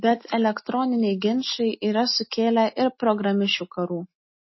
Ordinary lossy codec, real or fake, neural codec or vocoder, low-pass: MP3, 24 kbps; real; none; 7.2 kHz